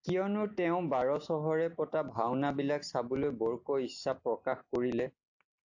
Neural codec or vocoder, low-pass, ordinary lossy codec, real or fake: none; 7.2 kHz; AAC, 48 kbps; real